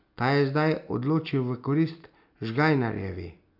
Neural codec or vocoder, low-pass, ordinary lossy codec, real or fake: none; 5.4 kHz; none; real